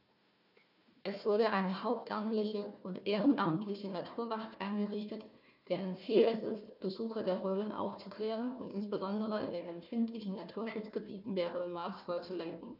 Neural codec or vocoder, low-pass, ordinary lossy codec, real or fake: codec, 16 kHz, 1 kbps, FunCodec, trained on Chinese and English, 50 frames a second; 5.4 kHz; none; fake